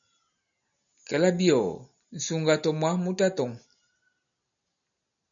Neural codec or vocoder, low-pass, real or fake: none; 7.2 kHz; real